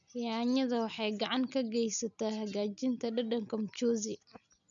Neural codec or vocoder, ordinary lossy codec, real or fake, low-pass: none; none; real; 7.2 kHz